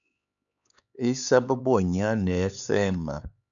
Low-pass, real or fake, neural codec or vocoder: 7.2 kHz; fake; codec, 16 kHz, 4 kbps, X-Codec, HuBERT features, trained on LibriSpeech